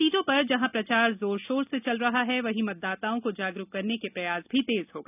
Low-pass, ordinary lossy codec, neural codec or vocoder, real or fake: 3.6 kHz; none; none; real